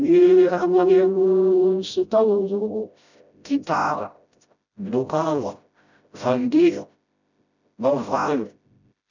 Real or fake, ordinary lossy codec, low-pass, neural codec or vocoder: fake; none; 7.2 kHz; codec, 16 kHz, 0.5 kbps, FreqCodec, smaller model